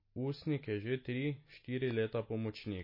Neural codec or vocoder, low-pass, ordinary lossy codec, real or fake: none; 5.4 kHz; MP3, 24 kbps; real